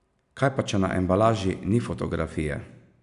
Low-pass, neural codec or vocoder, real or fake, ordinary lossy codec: 10.8 kHz; none; real; none